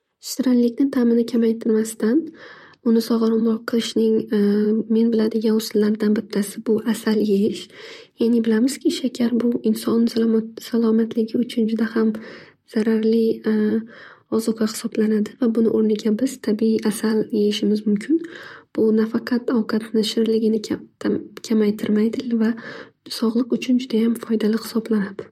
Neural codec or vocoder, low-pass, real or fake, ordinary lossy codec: vocoder, 44.1 kHz, 128 mel bands, Pupu-Vocoder; 19.8 kHz; fake; MP3, 64 kbps